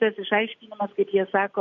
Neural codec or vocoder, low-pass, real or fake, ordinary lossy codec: none; 7.2 kHz; real; MP3, 48 kbps